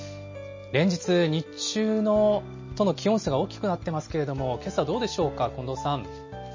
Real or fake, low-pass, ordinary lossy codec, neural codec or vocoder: real; 7.2 kHz; MP3, 32 kbps; none